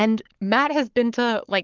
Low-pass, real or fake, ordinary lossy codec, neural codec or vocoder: 7.2 kHz; fake; Opus, 32 kbps; codec, 44.1 kHz, 7.8 kbps, Pupu-Codec